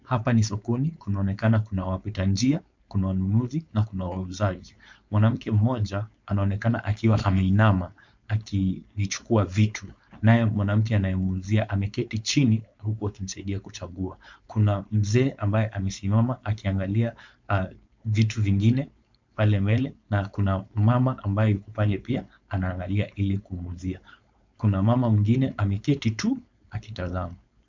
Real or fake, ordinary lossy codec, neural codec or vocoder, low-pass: fake; MP3, 64 kbps; codec, 16 kHz, 4.8 kbps, FACodec; 7.2 kHz